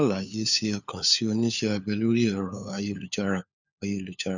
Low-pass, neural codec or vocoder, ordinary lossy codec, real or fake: 7.2 kHz; codec, 16 kHz, 4 kbps, FunCodec, trained on LibriTTS, 50 frames a second; none; fake